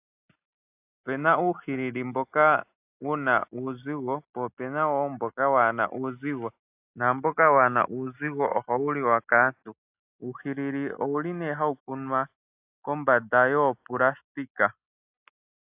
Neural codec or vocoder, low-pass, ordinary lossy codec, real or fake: none; 3.6 kHz; AAC, 32 kbps; real